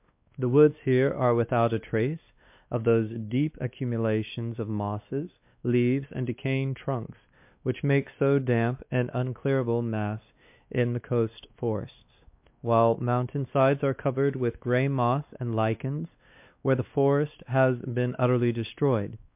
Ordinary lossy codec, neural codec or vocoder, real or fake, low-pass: MP3, 32 kbps; codec, 16 kHz, 2 kbps, X-Codec, WavLM features, trained on Multilingual LibriSpeech; fake; 3.6 kHz